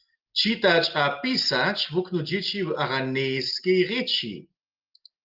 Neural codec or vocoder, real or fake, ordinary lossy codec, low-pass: none; real; Opus, 32 kbps; 5.4 kHz